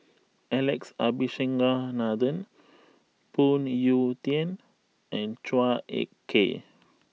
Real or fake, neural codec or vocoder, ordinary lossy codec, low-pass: real; none; none; none